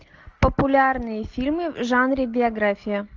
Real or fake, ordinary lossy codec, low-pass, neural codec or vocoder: real; Opus, 24 kbps; 7.2 kHz; none